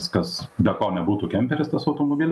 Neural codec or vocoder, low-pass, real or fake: none; 14.4 kHz; real